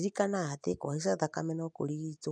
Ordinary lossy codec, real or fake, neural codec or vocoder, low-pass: none; real; none; 9.9 kHz